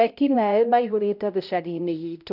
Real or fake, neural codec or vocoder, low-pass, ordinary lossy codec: fake; codec, 16 kHz, 0.5 kbps, X-Codec, HuBERT features, trained on balanced general audio; 5.4 kHz; none